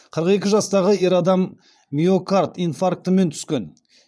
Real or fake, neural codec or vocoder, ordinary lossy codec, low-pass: fake; vocoder, 22.05 kHz, 80 mel bands, Vocos; none; none